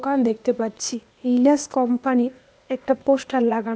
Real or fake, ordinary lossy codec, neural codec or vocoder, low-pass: fake; none; codec, 16 kHz, 0.8 kbps, ZipCodec; none